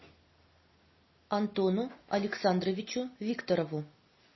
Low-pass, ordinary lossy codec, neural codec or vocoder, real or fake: 7.2 kHz; MP3, 24 kbps; none; real